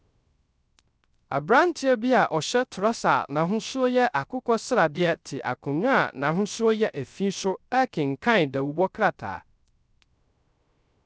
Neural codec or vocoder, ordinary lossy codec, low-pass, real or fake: codec, 16 kHz, 0.3 kbps, FocalCodec; none; none; fake